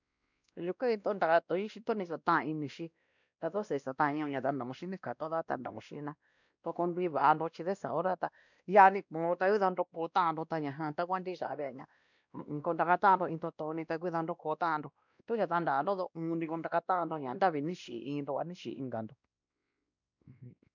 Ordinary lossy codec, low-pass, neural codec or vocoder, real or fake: none; 7.2 kHz; codec, 16 kHz, 1 kbps, X-Codec, WavLM features, trained on Multilingual LibriSpeech; fake